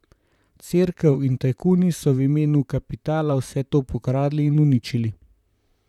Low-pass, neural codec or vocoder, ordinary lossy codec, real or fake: 19.8 kHz; vocoder, 44.1 kHz, 128 mel bands, Pupu-Vocoder; none; fake